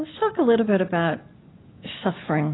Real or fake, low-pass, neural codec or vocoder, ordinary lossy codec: real; 7.2 kHz; none; AAC, 16 kbps